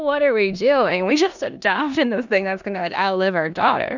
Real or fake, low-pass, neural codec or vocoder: fake; 7.2 kHz; codec, 16 kHz in and 24 kHz out, 0.9 kbps, LongCat-Audio-Codec, four codebook decoder